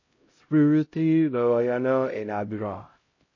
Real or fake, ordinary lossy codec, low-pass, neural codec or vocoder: fake; MP3, 32 kbps; 7.2 kHz; codec, 16 kHz, 0.5 kbps, X-Codec, HuBERT features, trained on LibriSpeech